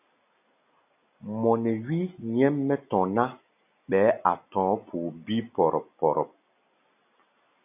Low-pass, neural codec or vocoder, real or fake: 3.6 kHz; none; real